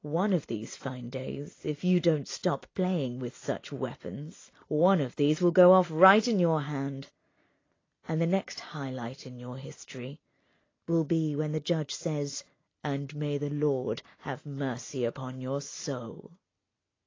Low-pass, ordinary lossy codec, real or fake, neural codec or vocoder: 7.2 kHz; AAC, 32 kbps; real; none